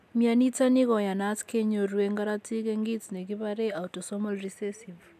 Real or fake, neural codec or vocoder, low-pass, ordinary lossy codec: real; none; 14.4 kHz; none